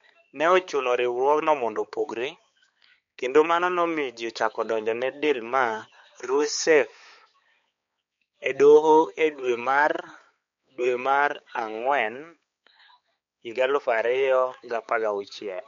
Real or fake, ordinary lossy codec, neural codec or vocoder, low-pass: fake; MP3, 48 kbps; codec, 16 kHz, 4 kbps, X-Codec, HuBERT features, trained on balanced general audio; 7.2 kHz